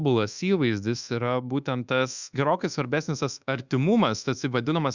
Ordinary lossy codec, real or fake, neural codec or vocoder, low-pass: Opus, 64 kbps; fake; codec, 24 kHz, 1.2 kbps, DualCodec; 7.2 kHz